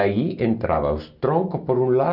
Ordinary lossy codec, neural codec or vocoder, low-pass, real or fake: none; none; 5.4 kHz; real